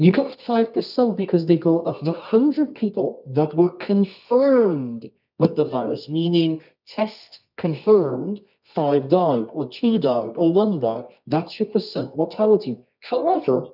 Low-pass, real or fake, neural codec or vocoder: 5.4 kHz; fake; codec, 24 kHz, 0.9 kbps, WavTokenizer, medium music audio release